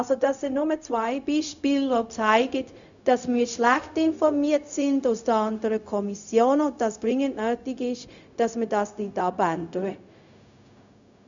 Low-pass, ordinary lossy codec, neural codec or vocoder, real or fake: 7.2 kHz; none; codec, 16 kHz, 0.4 kbps, LongCat-Audio-Codec; fake